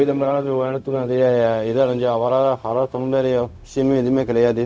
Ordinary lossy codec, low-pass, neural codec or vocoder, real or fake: none; none; codec, 16 kHz, 0.4 kbps, LongCat-Audio-Codec; fake